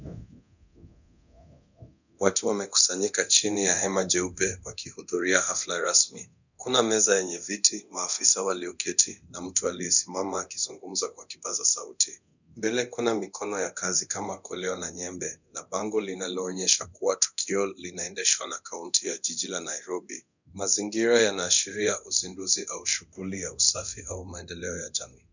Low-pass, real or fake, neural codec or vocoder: 7.2 kHz; fake; codec, 24 kHz, 0.9 kbps, DualCodec